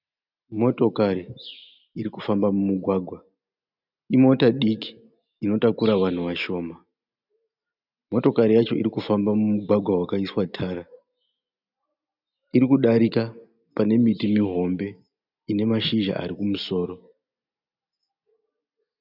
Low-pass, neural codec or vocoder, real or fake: 5.4 kHz; none; real